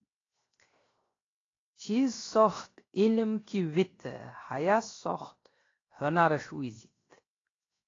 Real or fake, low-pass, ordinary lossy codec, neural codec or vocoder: fake; 7.2 kHz; AAC, 32 kbps; codec, 16 kHz, 0.7 kbps, FocalCodec